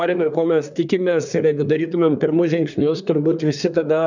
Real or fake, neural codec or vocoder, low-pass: fake; codec, 24 kHz, 1 kbps, SNAC; 7.2 kHz